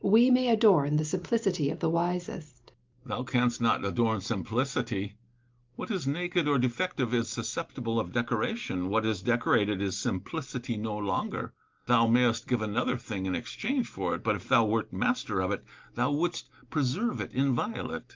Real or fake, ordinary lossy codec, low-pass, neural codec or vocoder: real; Opus, 32 kbps; 7.2 kHz; none